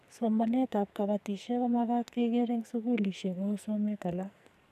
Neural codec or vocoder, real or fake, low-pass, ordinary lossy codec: codec, 44.1 kHz, 2.6 kbps, SNAC; fake; 14.4 kHz; none